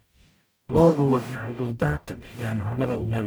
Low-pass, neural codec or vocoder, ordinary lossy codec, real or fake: none; codec, 44.1 kHz, 0.9 kbps, DAC; none; fake